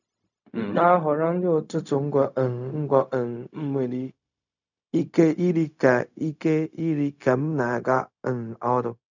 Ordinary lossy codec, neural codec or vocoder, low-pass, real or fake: none; codec, 16 kHz, 0.4 kbps, LongCat-Audio-Codec; 7.2 kHz; fake